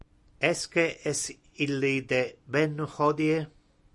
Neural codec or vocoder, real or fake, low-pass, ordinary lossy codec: none; real; 10.8 kHz; Opus, 64 kbps